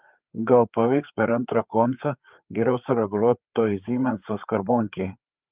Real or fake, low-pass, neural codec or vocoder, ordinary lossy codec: fake; 3.6 kHz; codec, 16 kHz, 4 kbps, FreqCodec, larger model; Opus, 24 kbps